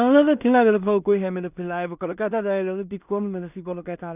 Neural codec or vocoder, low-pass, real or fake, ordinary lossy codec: codec, 16 kHz in and 24 kHz out, 0.4 kbps, LongCat-Audio-Codec, two codebook decoder; 3.6 kHz; fake; none